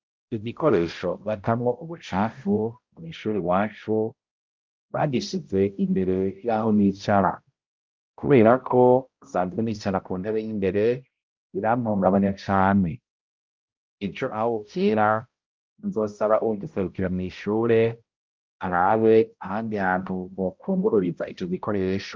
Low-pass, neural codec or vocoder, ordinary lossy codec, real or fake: 7.2 kHz; codec, 16 kHz, 0.5 kbps, X-Codec, HuBERT features, trained on balanced general audio; Opus, 32 kbps; fake